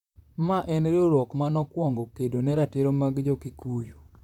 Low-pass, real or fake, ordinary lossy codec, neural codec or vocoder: 19.8 kHz; fake; none; vocoder, 44.1 kHz, 128 mel bands, Pupu-Vocoder